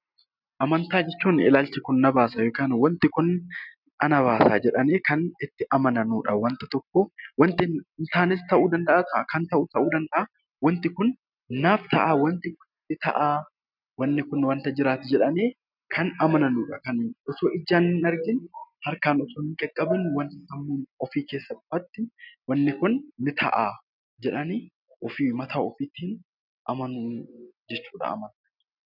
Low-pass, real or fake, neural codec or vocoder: 5.4 kHz; real; none